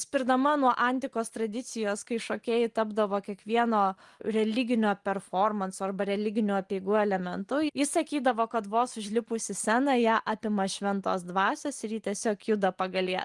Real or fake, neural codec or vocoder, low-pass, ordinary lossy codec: real; none; 10.8 kHz; Opus, 24 kbps